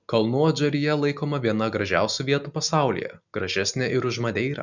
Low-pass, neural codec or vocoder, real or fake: 7.2 kHz; none; real